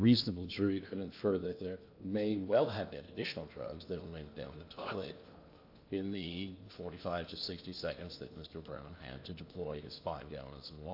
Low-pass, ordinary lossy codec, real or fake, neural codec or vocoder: 5.4 kHz; AAC, 48 kbps; fake; codec, 16 kHz in and 24 kHz out, 0.8 kbps, FocalCodec, streaming, 65536 codes